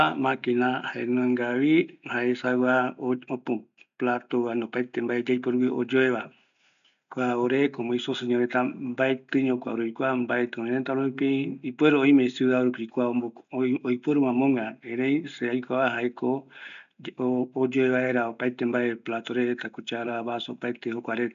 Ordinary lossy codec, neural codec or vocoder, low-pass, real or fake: none; none; 7.2 kHz; real